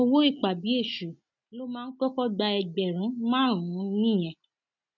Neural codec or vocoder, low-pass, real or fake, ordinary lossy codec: none; 7.2 kHz; real; none